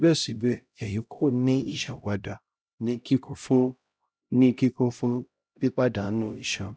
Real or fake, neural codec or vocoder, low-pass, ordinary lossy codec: fake; codec, 16 kHz, 0.5 kbps, X-Codec, HuBERT features, trained on LibriSpeech; none; none